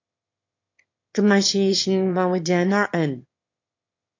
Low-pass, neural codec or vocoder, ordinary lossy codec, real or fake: 7.2 kHz; autoencoder, 22.05 kHz, a latent of 192 numbers a frame, VITS, trained on one speaker; MP3, 48 kbps; fake